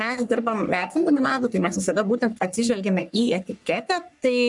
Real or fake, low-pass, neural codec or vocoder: fake; 10.8 kHz; codec, 44.1 kHz, 3.4 kbps, Pupu-Codec